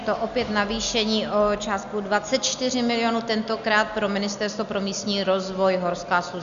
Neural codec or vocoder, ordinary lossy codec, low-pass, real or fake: none; MP3, 96 kbps; 7.2 kHz; real